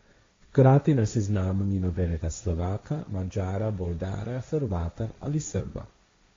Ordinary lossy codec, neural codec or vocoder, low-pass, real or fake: AAC, 32 kbps; codec, 16 kHz, 1.1 kbps, Voila-Tokenizer; 7.2 kHz; fake